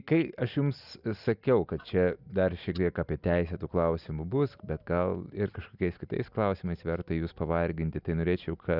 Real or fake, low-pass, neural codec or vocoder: real; 5.4 kHz; none